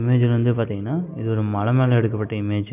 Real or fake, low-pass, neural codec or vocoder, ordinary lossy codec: real; 3.6 kHz; none; none